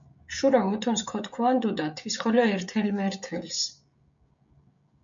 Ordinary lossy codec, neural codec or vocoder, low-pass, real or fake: MP3, 64 kbps; codec, 16 kHz, 16 kbps, FreqCodec, smaller model; 7.2 kHz; fake